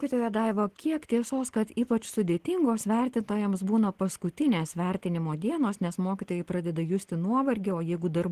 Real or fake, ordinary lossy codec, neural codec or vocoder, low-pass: real; Opus, 16 kbps; none; 14.4 kHz